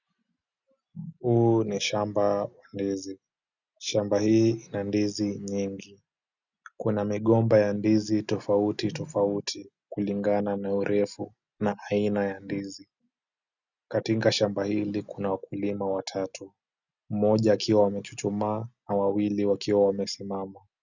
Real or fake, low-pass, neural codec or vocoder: real; 7.2 kHz; none